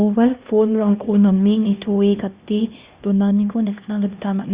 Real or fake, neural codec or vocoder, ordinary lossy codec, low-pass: fake; codec, 16 kHz, 1 kbps, X-Codec, HuBERT features, trained on LibriSpeech; Opus, 64 kbps; 3.6 kHz